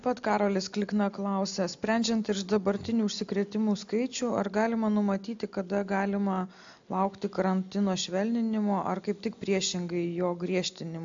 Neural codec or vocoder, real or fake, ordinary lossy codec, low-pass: none; real; AAC, 64 kbps; 7.2 kHz